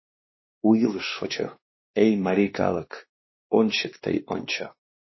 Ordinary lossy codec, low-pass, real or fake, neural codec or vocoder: MP3, 24 kbps; 7.2 kHz; fake; codec, 16 kHz, 2 kbps, X-Codec, WavLM features, trained on Multilingual LibriSpeech